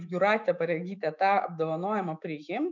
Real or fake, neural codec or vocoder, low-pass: fake; autoencoder, 48 kHz, 128 numbers a frame, DAC-VAE, trained on Japanese speech; 7.2 kHz